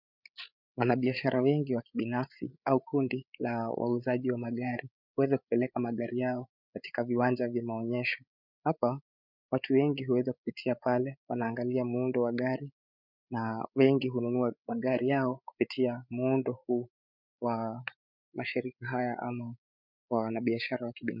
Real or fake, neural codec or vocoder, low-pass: fake; codec, 16 kHz, 8 kbps, FreqCodec, larger model; 5.4 kHz